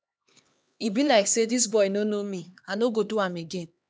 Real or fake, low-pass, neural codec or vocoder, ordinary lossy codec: fake; none; codec, 16 kHz, 2 kbps, X-Codec, HuBERT features, trained on LibriSpeech; none